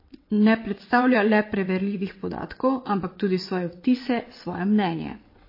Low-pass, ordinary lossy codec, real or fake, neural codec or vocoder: 5.4 kHz; MP3, 24 kbps; fake; vocoder, 22.05 kHz, 80 mel bands, WaveNeXt